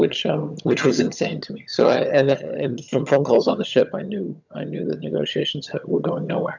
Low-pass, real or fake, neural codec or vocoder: 7.2 kHz; fake; vocoder, 22.05 kHz, 80 mel bands, HiFi-GAN